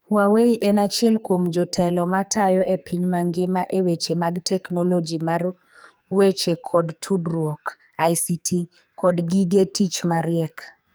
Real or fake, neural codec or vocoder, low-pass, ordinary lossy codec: fake; codec, 44.1 kHz, 2.6 kbps, SNAC; none; none